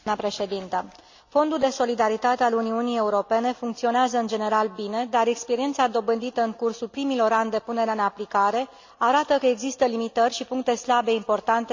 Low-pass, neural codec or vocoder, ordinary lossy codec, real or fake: 7.2 kHz; none; MP3, 64 kbps; real